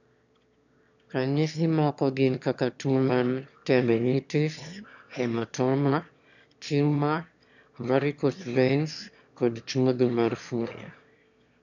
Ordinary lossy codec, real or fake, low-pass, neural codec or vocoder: none; fake; 7.2 kHz; autoencoder, 22.05 kHz, a latent of 192 numbers a frame, VITS, trained on one speaker